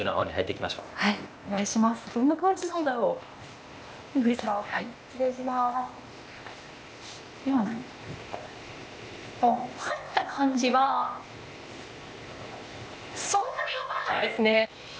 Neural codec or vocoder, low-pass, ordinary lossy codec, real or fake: codec, 16 kHz, 0.8 kbps, ZipCodec; none; none; fake